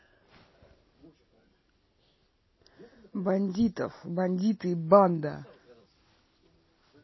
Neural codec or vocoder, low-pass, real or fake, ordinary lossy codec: none; 7.2 kHz; real; MP3, 24 kbps